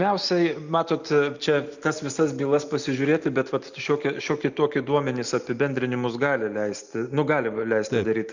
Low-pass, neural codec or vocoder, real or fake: 7.2 kHz; none; real